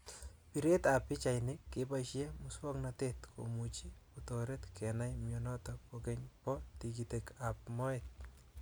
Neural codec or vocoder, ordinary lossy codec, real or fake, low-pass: none; none; real; none